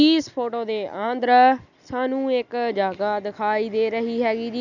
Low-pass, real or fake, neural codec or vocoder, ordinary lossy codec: 7.2 kHz; real; none; none